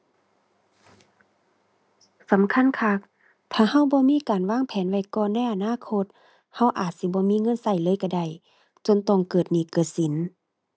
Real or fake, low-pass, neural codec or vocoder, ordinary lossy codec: real; none; none; none